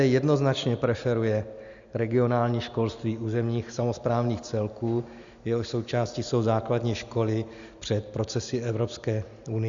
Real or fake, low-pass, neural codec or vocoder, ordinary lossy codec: real; 7.2 kHz; none; Opus, 64 kbps